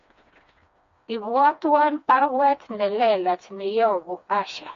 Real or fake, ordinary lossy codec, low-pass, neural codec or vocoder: fake; MP3, 48 kbps; 7.2 kHz; codec, 16 kHz, 2 kbps, FreqCodec, smaller model